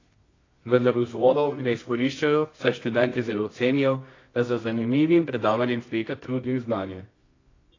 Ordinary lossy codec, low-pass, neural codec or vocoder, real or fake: AAC, 32 kbps; 7.2 kHz; codec, 24 kHz, 0.9 kbps, WavTokenizer, medium music audio release; fake